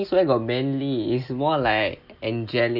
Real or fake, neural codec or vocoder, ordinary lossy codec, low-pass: real; none; none; 5.4 kHz